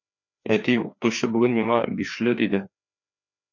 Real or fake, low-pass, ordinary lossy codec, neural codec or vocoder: fake; 7.2 kHz; MP3, 48 kbps; codec, 16 kHz, 2 kbps, FreqCodec, larger model